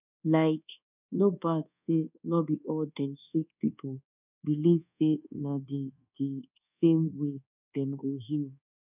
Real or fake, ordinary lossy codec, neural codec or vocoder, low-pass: fake; none; codec, 24 kHz, 1.2 kbps, DualCodec; 3.6 kHz